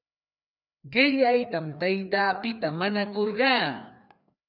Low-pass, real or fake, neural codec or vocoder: 5.4 kHz; fake; codec, 16 kHz, 2 kbps, FreqCodec, larger model